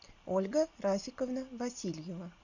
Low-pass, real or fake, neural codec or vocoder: 7.2 kHz; real; none